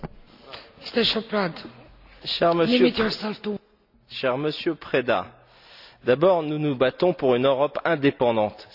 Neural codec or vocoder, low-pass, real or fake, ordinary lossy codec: none; 5.4 kHz; real; none